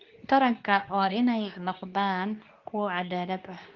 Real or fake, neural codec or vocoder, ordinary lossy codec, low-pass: fake; codec, 24 kHz, 0.9 kbps, WavTokenizer, medium speech release version 2; Opus, 24 kbps; 7.2 kHz